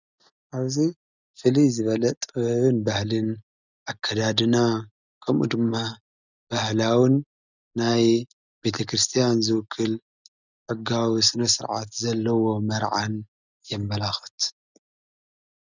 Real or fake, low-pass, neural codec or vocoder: real; 7.2 kHz; none